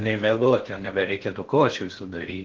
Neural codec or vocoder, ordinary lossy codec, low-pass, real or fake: codec, 16 kHz in and 24 kHz out, 0.6 kbps, FocalCodec, streaming, 4096 codes; Opus, 16 kbps; 7.2 kHz; fake